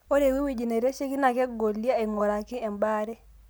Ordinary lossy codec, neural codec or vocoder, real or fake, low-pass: none; none; real; none